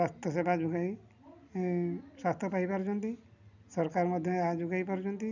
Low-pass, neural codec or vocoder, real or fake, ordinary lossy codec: 7.2 kHz; none; real; none